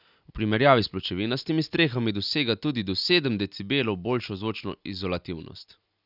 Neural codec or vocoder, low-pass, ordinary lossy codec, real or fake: none; 5.4 kHz; none; real